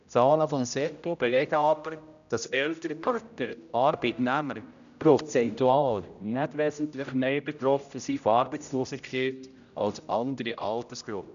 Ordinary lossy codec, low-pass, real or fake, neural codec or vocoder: none; 7.2 kHz; fake; codec, 16 kHz, 0.5 kbps, X-Codec, HuBERT features, trained on general audio